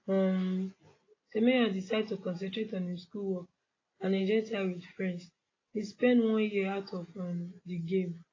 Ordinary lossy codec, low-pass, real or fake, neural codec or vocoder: AAC, 32 kbps; 7.2 kHz; real; none